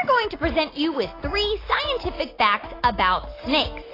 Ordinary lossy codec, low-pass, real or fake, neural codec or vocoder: AAC, 24 kbps; 5.4 kHz; real; none